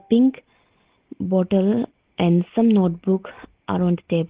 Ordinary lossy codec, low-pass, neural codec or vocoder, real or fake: Opus, 16 kbps; 3.6 kHz; none; real